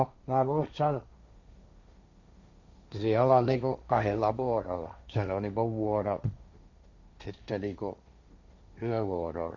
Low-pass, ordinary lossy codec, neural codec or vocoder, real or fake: 7.2 kHz; Opus, 64 kbps; codec, 16 kHz, 1.1 kbps, Voila-Tokenizer; fake